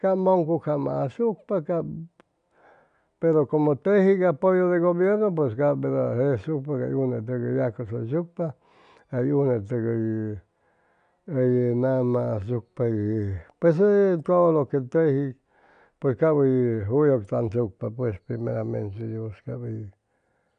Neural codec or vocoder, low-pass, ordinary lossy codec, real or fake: none; 9.9 kHz; none; real